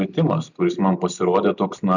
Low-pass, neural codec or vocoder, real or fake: 7.2 kHz; none; real